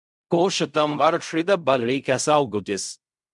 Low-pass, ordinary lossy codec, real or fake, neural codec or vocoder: 10.8 kHz; MP3, 96 kbps; fake; codec, 16 kHz in and 24 kHz out, 0.4 kbps, LongCat-Audio-Codec, fine tuned four codebook decoder